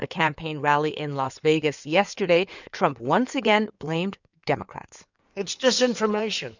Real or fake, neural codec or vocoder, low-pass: fake; codec, 16 kHz in and 24 kHz out, 2.2 kbps, FireRedTTS-2 codec; 7.2 kHz